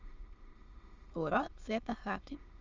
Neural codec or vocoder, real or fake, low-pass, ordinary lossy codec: autoencoder, 22.05 kHz, a latent of 192 numbers a frame, VITS, trained on many speakers; fake; 7.2 kHz; Opus, 32 kbps